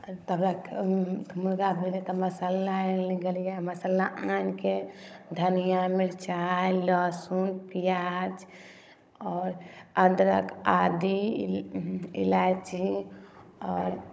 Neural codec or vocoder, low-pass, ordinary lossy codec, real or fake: codec, 16 kHz, 16 kbps, FunCodec, trained on Chinese and English, 50 frames a second; none; none; fake